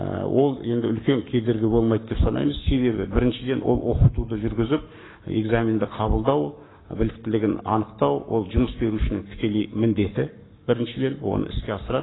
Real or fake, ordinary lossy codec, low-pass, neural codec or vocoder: fake; AAC, 16 kbps; 7.2 kHz; codec, 44.1 kHz, 7.8 kbps, Pupu-Codec